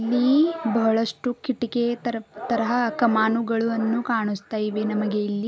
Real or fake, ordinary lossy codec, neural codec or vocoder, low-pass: real; none; none; none